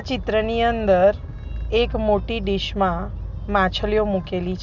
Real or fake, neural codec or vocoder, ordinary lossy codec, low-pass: real; none; none; 7.2 kHz